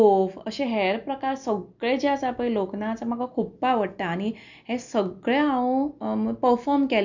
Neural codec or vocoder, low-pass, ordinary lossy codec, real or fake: none; 7.2 kHz; none; real